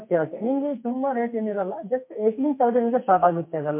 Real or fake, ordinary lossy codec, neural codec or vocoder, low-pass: fake; MP3, 32 kbps; codec, 44.1 kHz, 2.6 kbps, SNAC; 3.6 kHz